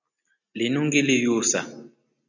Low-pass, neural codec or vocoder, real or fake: 7.2 kHz; none; real